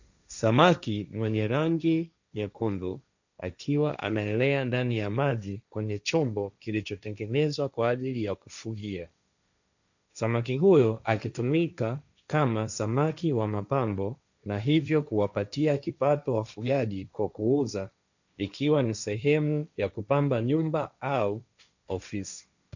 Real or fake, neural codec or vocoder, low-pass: fake; codec, 16 kHz, 1.1 kbps, Voila-Tokenizer; 7.2 kHz